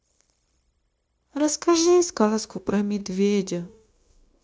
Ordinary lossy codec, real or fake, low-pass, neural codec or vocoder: none; fake; none; codec, 16 kHz, 0.9 kbps, LongCat-Audio-Codec